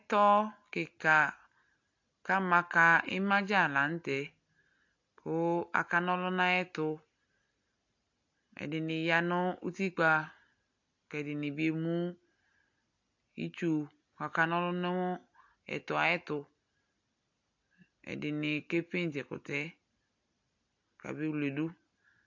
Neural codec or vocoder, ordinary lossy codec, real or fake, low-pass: none; AAC, 48 kbps; real; 7.2 kHz